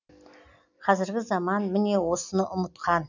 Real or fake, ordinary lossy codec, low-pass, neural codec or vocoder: real; none; 7.2 kHz; none